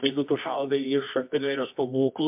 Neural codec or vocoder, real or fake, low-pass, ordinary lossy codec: codec, 24 kHz, 0.9 kbps, WavTokenizer, medium music audio release; fake; 3.6 kHz; MP3, 32 kbps